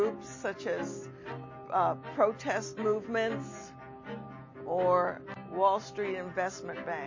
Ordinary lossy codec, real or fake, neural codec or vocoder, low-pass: MP3, 32 kbps; real; none; 7.2 kHz